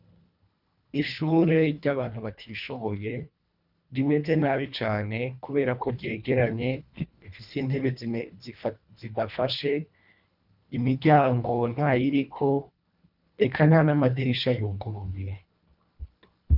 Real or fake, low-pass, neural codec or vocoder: fake; 5.4 kHz; codec, 24 kHz, 1.5 kbps, HILCodec